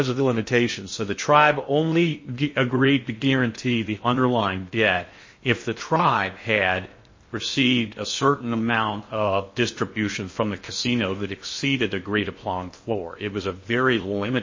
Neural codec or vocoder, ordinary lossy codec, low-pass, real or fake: codec, 16 kHz in and 24 kHz out, 0.6 kbps, FocalCodec, streaming, 2048 codes; MP3, 32 kbps; 7.2 kHz; fake